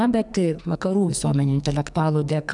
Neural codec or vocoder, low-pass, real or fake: codec, 44.1 kHz, 2.6 kbps, SNAC; 10.8 kHz; fake